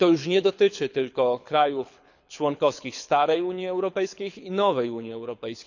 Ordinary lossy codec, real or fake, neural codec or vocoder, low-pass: none; fake; codec, 24 kHz, 6 kbps, HILCodec; 7.2 kHz